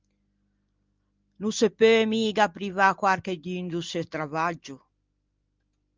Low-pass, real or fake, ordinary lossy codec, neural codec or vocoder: 7.2 kHz; real; Opus, 24 kbps; none